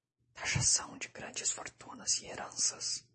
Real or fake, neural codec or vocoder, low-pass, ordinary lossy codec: real; none; 10.8 kHz; MP3, 32 kbps